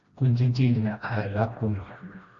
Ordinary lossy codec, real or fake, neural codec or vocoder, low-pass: Opus, 64 kbps; fake; codec, 16 kHz, 1 kbps, FreqCodec, smaller model; 7.2 kHz